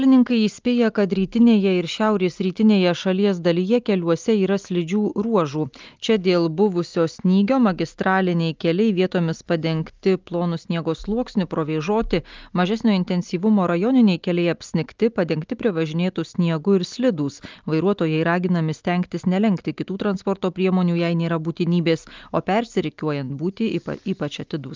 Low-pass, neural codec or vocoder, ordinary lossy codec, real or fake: 7.2 kHz; none; Opus, 24 kbps; real